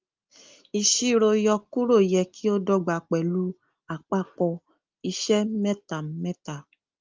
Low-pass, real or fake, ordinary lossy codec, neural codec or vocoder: 7.2 kHz; real; Opus, 24 kbps; none